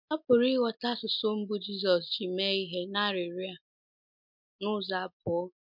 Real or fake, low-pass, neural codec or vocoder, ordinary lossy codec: real; 5.4 kHz; none; MP3, 48 kbps